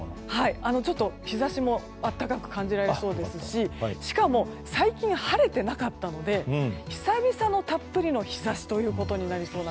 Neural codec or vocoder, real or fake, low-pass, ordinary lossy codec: none; real; none; none